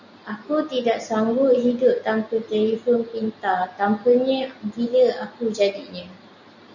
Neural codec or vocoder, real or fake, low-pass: none; real; 7.2 kHz